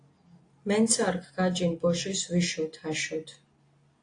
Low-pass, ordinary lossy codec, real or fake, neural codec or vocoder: 9.9 kHz; AAC, 48 kbps; real; none